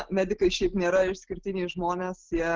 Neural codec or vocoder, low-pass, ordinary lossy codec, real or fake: none; 7.2 kHz; Opus, 16 kbps; real